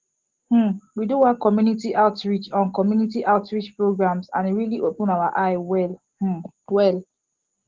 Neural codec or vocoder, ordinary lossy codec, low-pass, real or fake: none; Opus, 16 kbps; 7.2 kHz; real